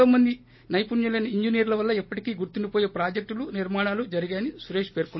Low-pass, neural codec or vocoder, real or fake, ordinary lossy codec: 7.2 kHz; none; real; MP3, 24 kbps